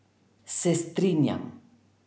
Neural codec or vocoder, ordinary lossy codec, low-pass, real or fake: none; none; none; real